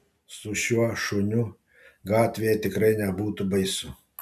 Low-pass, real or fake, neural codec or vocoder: 14.4 kHz; real; none